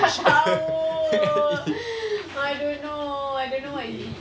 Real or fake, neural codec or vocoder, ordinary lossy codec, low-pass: real; none; none; none